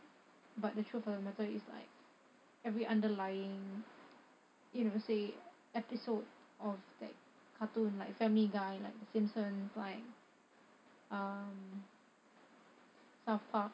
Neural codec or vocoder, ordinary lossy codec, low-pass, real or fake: none; none; none; real